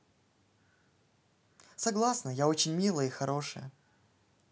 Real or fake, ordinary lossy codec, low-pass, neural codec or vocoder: real; none; none; none